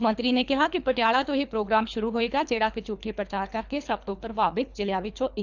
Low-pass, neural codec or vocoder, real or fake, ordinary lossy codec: 7.2 kHz; codec, 24 kHz, 3 kbps, HILCodec; fake; none